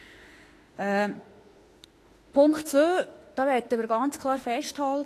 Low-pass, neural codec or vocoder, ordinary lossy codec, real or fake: 14.4 kHz; autoencoder, 48 kHz, 32 numbers a frame, DAC-VAE, trained on Japanese speech; AAC, 48 kbps; fake